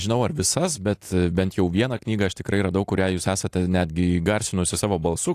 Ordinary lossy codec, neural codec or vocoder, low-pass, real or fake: AAC, 64 kbps; none; 14.4 kHz; real